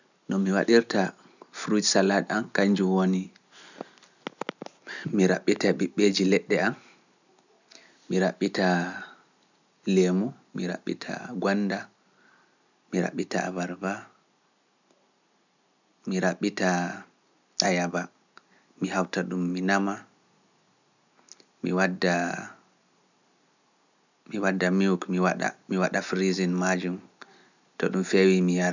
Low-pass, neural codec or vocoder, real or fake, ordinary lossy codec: 7.2 kHz; none; real; none